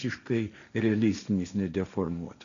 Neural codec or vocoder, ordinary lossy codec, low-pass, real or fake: codec, 16 kHz, 1.1 kbps, Voila-Tokenizer; AAC, 96 kbps; 7.2 kHz; fake